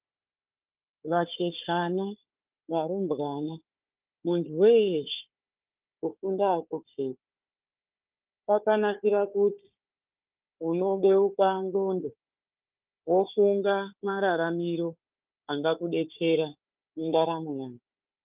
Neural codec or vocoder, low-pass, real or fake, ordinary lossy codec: codec, 16 kHz, 4 kbps, FunCodec, trained on Chinese and English, 50 frames a second; 3.6 kHz; fake; Opus, 32 kbps